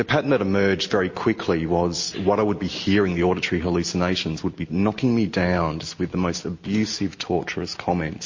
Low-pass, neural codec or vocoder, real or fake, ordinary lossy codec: 7.2 kHz; none; real; MP3, 32 kbps